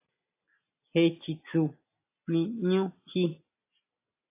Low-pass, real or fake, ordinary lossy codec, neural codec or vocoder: 3.6 kHz; real; AAC, 24 kbps; none